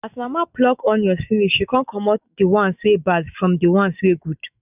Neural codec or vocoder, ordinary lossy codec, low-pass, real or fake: autoencoder, 48 kHz, 128 numbers a frame, DAC-VAE, trained on Japanese speech; none; 3.6 kHz; fake